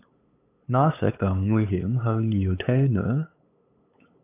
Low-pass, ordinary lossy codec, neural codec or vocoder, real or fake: 3.6 kHz; AAC, 24 kbps; codec, 16 kHz, 8 kbps, FunCodec, trained on LibriTTS, 25 frames a second; fake